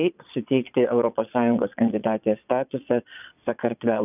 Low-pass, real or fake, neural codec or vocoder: 3.6 kHz; fake; vocoder, 22.05 kHz, 80 mel bands, Vocos